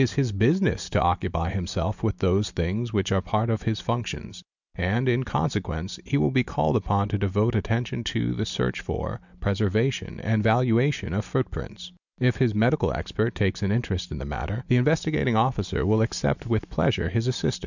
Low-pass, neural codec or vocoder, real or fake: 7.2 kHz; none; real